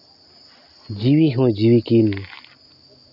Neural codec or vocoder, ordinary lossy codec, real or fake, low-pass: none; none; real; 5.4 kHz